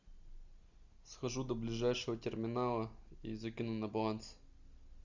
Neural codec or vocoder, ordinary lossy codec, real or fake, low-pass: none; Opus, 64 kbps; real; 7.2 kHz